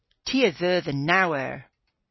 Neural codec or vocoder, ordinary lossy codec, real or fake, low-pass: none; MP3, 24 kbps; real; 7.2 kHz